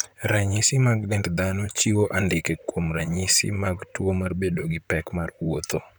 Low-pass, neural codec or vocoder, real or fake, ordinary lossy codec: none; vocoder, 44.1 kHz, 128 mel bands, Pupu-Vocoder; fake; none